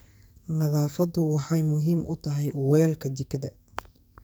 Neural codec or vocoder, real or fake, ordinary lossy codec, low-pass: codec, 44.1 kHz, 2.6 kbps, SNAC; fake; none; none